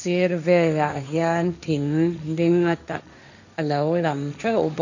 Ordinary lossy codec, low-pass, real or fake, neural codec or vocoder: none; 7.2 kHz; fake; codec, 16 kHz, 1.1 kbps, Voila-Tokenizer